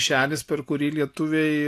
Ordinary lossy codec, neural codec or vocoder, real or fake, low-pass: AAC, 64 kbps; none; real; 14.4 kHz